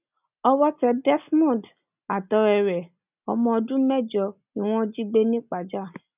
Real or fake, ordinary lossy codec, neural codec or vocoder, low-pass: real; none; none; 3.6 kHz